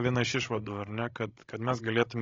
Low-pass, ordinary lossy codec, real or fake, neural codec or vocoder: 7.2 kHz; AAC, 32 kbps; fake; codec, 16 kHz, 16 kbps, FreqCodec, larger model